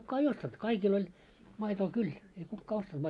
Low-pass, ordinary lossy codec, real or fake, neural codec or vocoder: 10.8 kHz; AAC, 48 kbps; real; none